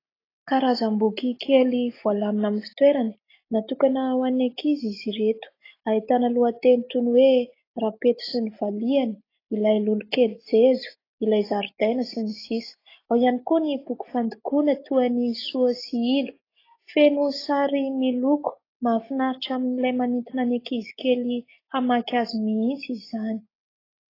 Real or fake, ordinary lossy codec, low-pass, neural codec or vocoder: real; AAC, 24 kbps; 5.4 kHz; none